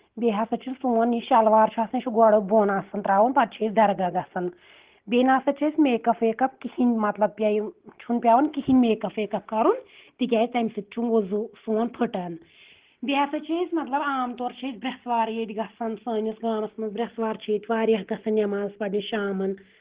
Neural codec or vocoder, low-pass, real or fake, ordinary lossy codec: none; 3.6 kHz; real; Opus, 16 kbps